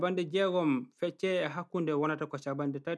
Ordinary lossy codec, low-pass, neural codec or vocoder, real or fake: none; none; none; real